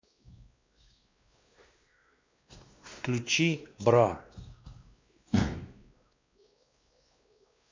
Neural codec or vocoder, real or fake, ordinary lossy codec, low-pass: codec, 16 kHz, 2 kbps, X-Codec, WavLM features, trained on Multilingual LibriSpeech; fake; MP3, 64 kbps; 7.2 kHz